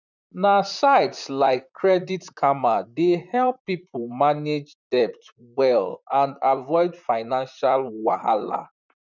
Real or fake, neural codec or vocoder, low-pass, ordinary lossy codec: fake; vocoder, 44.1 kHz, 128 mel bands, Pupu-Vocoder; 7.2 kHz; none